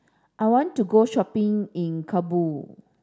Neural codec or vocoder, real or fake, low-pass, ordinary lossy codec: none; real; none; none